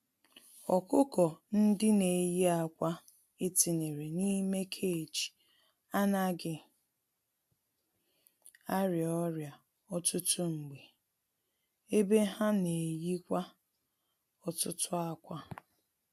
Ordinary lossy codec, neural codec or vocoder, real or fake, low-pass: AAC, 96 kbps; none; real; 14.4 kHz